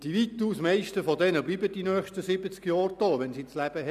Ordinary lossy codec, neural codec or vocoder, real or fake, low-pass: none; none; real; 14.4 kHz